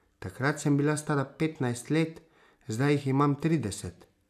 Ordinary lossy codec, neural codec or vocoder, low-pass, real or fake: none; none; 14.4 kHz; real